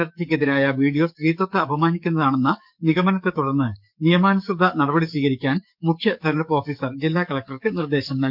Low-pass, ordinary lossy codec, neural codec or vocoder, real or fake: 5.4 kHz; none; codec, 44.1 kHz, 7.8 kbps, DAC; fake